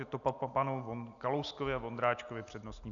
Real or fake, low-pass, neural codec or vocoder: real; 7.2 kHz; none